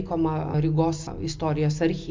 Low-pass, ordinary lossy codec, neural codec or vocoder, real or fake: 7.2 kHz; MP3, 64 kbps; none; real